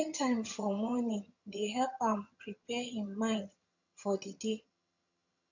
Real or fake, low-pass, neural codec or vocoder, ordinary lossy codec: fake; 7.2 kHz; vocoder, 22.05 kHz, 80 mel bands, HiFi-GAN; none